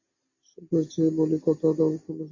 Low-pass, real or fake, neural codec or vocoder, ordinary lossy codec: 7.2 kHz; real; none; MP3, 32 kbps